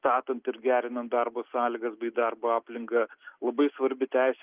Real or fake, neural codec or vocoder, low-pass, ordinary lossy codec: real; none; 3.6 kHz; Opus, 24 kbps